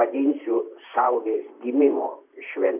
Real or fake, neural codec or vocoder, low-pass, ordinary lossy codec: fake; vocoder, 44.1 kHz, 128 mel bands, Pupu-Vocoder; 3.6 kHz; MP3, 32 kbps